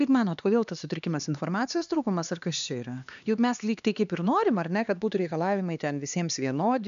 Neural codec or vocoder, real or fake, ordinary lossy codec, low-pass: codec, 16 kHz, 2 kbps, X-Codec, HuBERT features, trained on LibriSpeech; fake; MP3, 96 kbps; 7.2 kHz